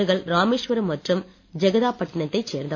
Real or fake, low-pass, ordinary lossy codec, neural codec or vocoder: real; 7.2 kHz; MP3, 64 kbps; none